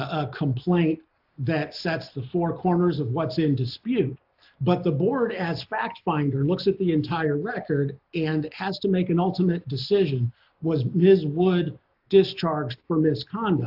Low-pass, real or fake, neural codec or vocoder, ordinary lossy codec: 5.4 kHz; real; none; AAC, 48 kbps